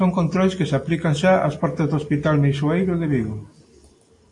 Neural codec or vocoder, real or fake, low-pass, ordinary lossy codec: none; real; 10.8 kHz; AAC, 48 kbps